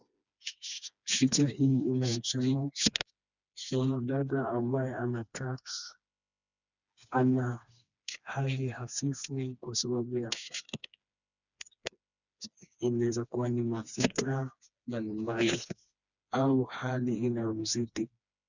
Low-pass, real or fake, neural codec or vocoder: 7.2 kHz; fake; codec, 16 kHz, 2 kbps, FreqCodec, smaller model